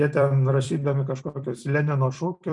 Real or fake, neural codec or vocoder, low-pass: fake; vocoder, 44.1 kHz, 128 mel bands every 256 samples, BigVGAN v2; 10.8 kHz